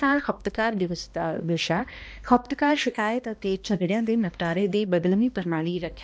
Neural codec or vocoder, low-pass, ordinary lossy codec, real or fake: codec, 16 kHz, 1 kbps, X-Codec, HuBERT features, trained on balanced general audio; none; none; fake